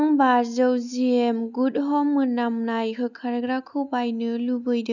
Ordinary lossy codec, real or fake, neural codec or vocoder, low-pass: none; real; none; 7.2 kHz